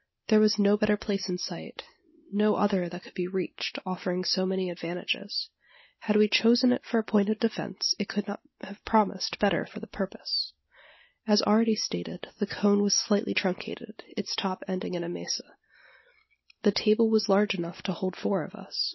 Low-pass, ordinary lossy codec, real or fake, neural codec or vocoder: 7.2 kHz; MP3, 24 kbps; real; none